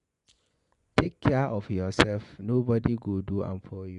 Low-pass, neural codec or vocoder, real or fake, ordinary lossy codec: 10.8 kHz; vocoder, 24 kHz, 100 mel bands, Vocos; fake; none